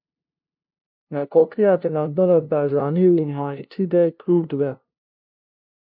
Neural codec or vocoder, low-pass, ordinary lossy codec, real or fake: codec, 16 kHz, 0.5 kbps, FunCodec, trained on LibriTTS, 25 frames a second; 5.4 kHz; MP3, 48 kbps; fake